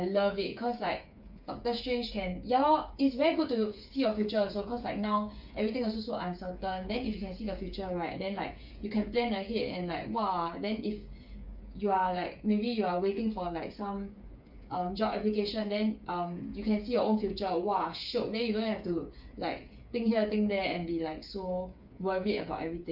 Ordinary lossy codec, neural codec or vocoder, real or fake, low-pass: none; codec, 16 kHz, 8 kbps, FreqCodec, smaller model; fake; 5.4 kHz